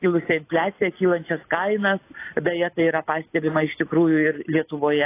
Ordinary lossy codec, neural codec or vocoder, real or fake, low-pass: AAC, 24 kbps; none; real; 3.6 kHz